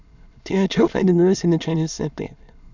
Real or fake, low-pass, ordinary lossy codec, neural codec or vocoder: fake; 7.2 kHz; none; autoencoder, 22.05 kHz, a latent of 192 numbers a frame, VITS, trained on many speakers